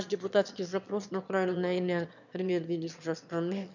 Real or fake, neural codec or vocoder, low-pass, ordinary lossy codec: fake; autoencoder, 22.05 kHz, a latent of 192 numbers a frame, VITS, trained on one speaker; 7.2 kHz; none